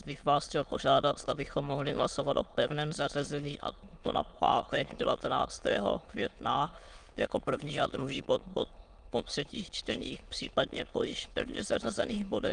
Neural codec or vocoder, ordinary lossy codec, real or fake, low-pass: autoencoder, 22.05 kHz, a latent of 192 numbers a frame, VITS, trained on many speakers; Opus, 32 kbps; fake; 9.9 kHz